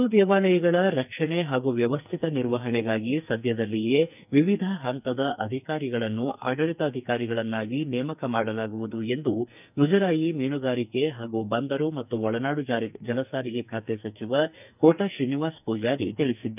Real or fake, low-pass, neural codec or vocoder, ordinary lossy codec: fake; 3.6 kHz; codec, 44.1 kHz, 2.6 kbps, SNAC; none